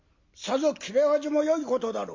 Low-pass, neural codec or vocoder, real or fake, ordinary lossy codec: 7.2 kHz; none; real; MP3, 48 kbps